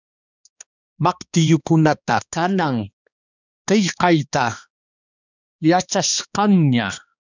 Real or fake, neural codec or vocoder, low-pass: fake; codec, 16 kHz, 2 kbps, X-Codec, HuBERT features, trained on balanced general audio; 7.2 kHz